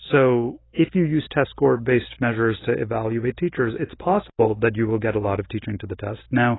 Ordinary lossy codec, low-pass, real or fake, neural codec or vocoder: AAC, 16 kbps; 7.2 kHz; real; none